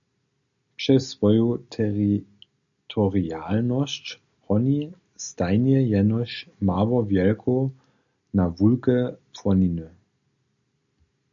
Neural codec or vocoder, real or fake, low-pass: none; real; 7.2 kHz